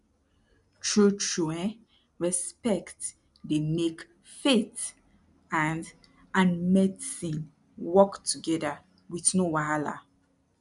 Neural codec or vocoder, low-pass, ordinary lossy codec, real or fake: none; 10.8 kHz; none; real